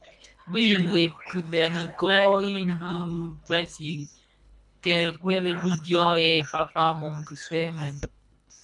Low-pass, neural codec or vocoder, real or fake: 10.8 kHz; codec, 24 kHz, 1.5 kbps, HILCodec; fake